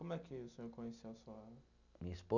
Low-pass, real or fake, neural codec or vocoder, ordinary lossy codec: 7.2 kHz; real; none; none